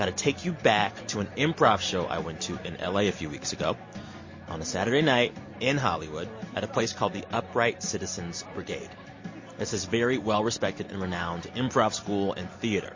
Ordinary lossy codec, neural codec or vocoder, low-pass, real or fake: MP3, 32 kbps; none; 7.2 kHz; real